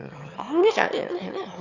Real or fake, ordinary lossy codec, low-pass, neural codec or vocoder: fake; none; 7.2 kHz; autoencoder, 22.05 kHz, a latent of 192 numbers a frame, VITS, trained on one speaker